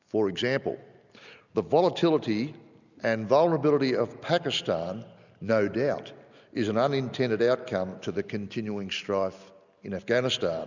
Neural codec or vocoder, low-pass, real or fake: none; 7.2 kHz; real